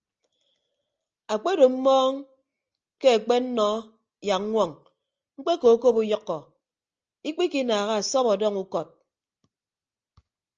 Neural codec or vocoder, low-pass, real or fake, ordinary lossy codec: none; 7.2 kHz; real; Opus, 24 kbps